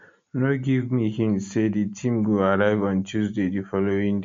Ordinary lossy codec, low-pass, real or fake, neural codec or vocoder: MP3, 48 kbps; 7.2 kHz; real; none